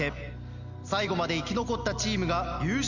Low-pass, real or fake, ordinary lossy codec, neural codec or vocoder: 7.2 kHz; real; none; none